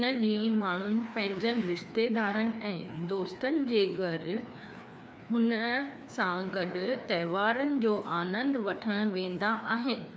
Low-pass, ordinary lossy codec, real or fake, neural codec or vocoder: none; none; fake; codec, 16 kHz, 2 kbps, FreqCodec, larger model